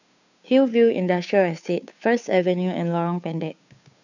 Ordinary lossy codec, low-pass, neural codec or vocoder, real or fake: none; 7.2 kHz; codec, 16 kHz, 2 kbps, FunCodec, trained on Chinese and English, 25 frames a second; fake